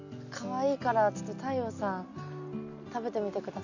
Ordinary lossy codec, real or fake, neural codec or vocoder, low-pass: none; real; none; 7.2 kHz